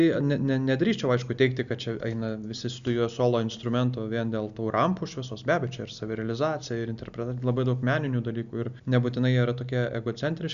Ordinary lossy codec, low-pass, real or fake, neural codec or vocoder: Opus, 64 kbps; 7.2 kHz; real; none